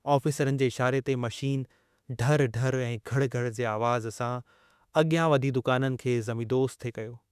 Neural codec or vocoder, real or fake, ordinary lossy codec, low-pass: autoencoder, 48 kHz, 32 numbers a frame, DAC-VAE, trained on Japanese speech; fake; none; 14.4 kHz